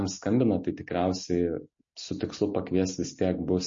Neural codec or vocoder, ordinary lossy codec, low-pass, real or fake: none; MP3, 32 kbps; 7.2 kHz; real